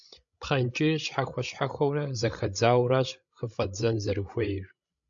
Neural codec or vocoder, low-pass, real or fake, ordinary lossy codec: codec, 16 kHz, 16 kbps, FreqCodec, larger model; 7.2 kHz; fake; AAC, 64 kbps